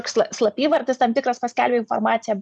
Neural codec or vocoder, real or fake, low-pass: vocoder, 44.1 kHz, 128 mel bands every 512 samples, BigVGAN v2; fake; 10.8 kHz